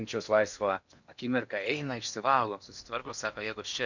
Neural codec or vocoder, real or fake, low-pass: codec, 16 kHz in and 24 kHz out, 0.8 kbps, FocalCodec, streaming, 65536 codes; fake; 7.2 kHz